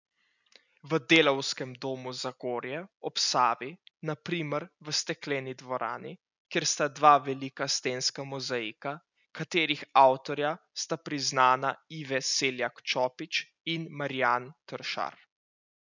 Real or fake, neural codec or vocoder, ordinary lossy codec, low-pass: real; none; none; 7.2 kHz